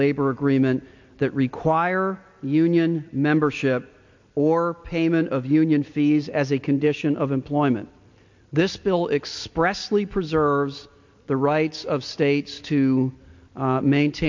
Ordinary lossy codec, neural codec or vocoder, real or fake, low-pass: MP3, 48 kbps; none; real; 7.2 kHz